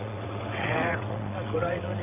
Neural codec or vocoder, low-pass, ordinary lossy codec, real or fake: vocoder, 22.05 kHz, 80 mel bands, WaveNeXt; 3.6 kHz; none; fake